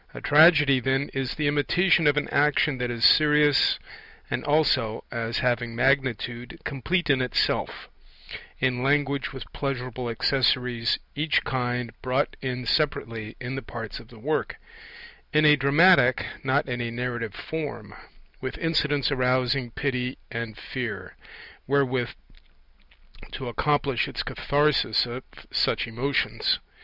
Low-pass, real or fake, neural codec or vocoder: 5.4 kHz; real; none